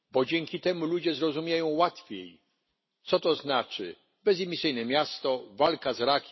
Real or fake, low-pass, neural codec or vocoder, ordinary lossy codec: real; 7.2 kHz; none; MP3, 24 kbps